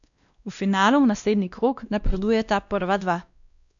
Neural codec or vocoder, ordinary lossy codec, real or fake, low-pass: codec, 16 kHz, 1 kbps, X-Codec, WavLM features, trained on Multilingual LibriSpeech; none; fake; 7.2 kHz